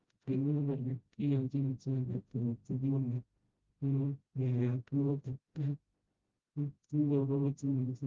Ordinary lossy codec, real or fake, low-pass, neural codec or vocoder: Opus, 32 kbps; fake; 7.2 kHz; codec, 16 kHz, 0.5 kbps, FreqCodec, smaller model